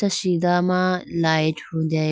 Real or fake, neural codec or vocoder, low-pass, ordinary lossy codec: real; none; none; none